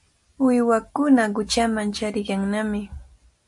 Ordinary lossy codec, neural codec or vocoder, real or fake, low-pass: MP3, 48 kbps; none; real; 10.8 kHz